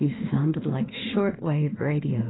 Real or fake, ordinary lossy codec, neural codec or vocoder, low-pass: fake; AAC, 16 kbps; autoencoder, 48 kHz, 32 numbers a frame, DAC-VAE, trained on Japanese speech; 7.2 kHz